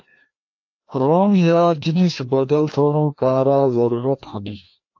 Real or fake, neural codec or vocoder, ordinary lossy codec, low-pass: fake; codec, 16 kHz, 1 kbps, FreqCodec, larger model; AAC, 48 kbps; 7.2 kHz